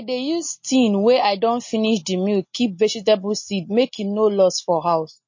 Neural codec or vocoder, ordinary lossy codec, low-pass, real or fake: none; MP3, 32 kbps; 7.2 kHz; real